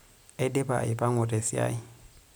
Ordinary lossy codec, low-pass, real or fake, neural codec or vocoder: none; none; real; none